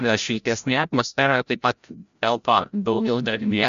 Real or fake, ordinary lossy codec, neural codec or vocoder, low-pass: fake; MP3, 64 kbps; codec, 16 kHz, 0.5 kbps, FreqCodec, larger model; 7.2 kHz